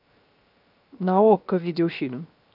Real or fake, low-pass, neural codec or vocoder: fake; 5.4 kHz; codec, 16 kHz, 0.7 kbps, FocalCodec